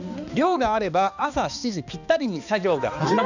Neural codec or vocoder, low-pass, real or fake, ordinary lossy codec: codec, 16 kHz, 2 kbps, X-Codec, HuBERT features, trained on balanced general audio; 7.2 kHz; fake; none